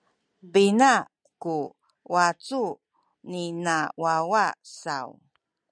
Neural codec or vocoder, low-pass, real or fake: none; 9.9 kHz; real